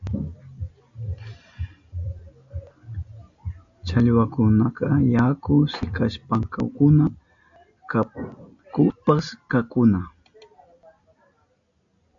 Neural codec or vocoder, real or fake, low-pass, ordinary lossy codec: none; real; 7.2 kHz; AAC, 64 kbps